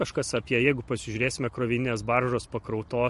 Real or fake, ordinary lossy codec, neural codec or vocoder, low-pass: fake; MP3, 48 kbps; vocoder, 44.1 kHz, 128 mel bands every 512 samples, BigVGAN v2; 14.4 kHz